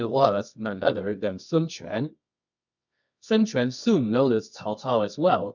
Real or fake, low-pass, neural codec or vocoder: fake; 7.2 kHz; codec, 24 kHz, 0.9 kbps, WavTokenizer, medium music audio release